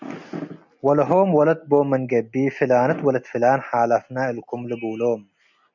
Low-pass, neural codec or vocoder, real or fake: 7.2 kHz; none; real